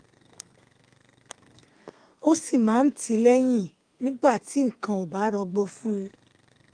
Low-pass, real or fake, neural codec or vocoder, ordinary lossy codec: 9.9 kHz; fake; codec, 32 kHz, 1.9 kbps, SNAC; Opus, 32 kbps